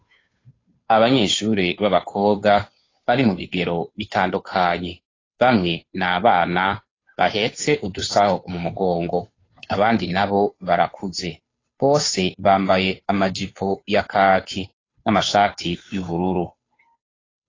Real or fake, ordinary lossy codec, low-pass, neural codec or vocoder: fake; AAC, 32 kbps; 7.2 kHz; codec, 16 kHz, 2 kbps, FunCodec, trained on Chinese and English, 25 frames a second